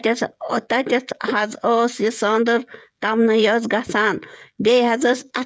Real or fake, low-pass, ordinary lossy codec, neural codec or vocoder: fake; none; none; codec, 16 kHz, 16 kbps, FreqCodec, smaller model